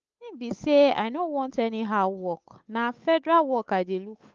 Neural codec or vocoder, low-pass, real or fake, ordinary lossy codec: codec, 16 kHz, 8 kbps, FunCodec, trained on Chinese and English, 25 frames a second; 7.2 kHz; fake; Opus, 24 kbps